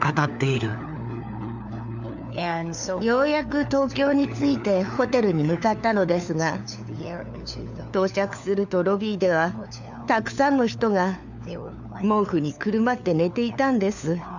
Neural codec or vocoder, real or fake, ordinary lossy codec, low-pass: codec, 16 kHz, 4 kbps, FunCodec, trained on LibriTTS, 50 frames a second; fake; none; 7.2 kHz